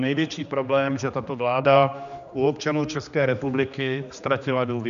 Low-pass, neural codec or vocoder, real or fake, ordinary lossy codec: 7.2 kHz; codec, 16 kHz, 2 kbps, X-Codec, HuBERT features, trained on general audio; fake; AAC, 96 kbps